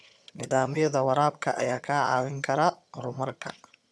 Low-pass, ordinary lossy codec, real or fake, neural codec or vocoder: none; none; fake; vocoder, 22.05 kHz, 80 mel bands, HiFi-GAN